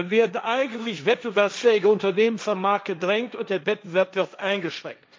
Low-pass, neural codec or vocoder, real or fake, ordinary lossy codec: none; codec, 16 kHz, 1.1 kbps, Voila-Tokenizer; fake; none